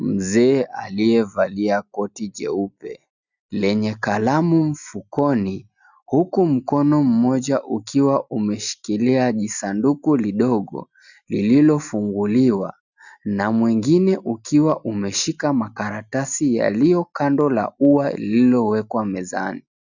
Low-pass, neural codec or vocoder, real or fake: 7.2 kHz; none; real